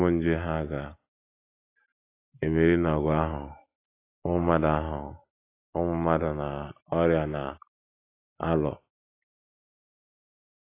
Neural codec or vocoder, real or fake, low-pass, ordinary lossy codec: none; real; 3.6 kHz; AAC, 24 kbps